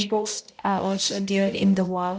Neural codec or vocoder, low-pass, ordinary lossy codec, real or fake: codec, 16 kHz, 0.5 kbps, X-Codec, HuBERT features, trained on balanced general audio; none; none; fake